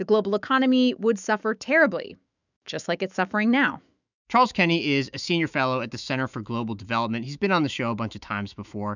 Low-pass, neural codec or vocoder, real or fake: 7.2 kHz; autoencoder, 48 kHz, 128 numbers a frame, DAC-VAE, trained on Japanese speech; fake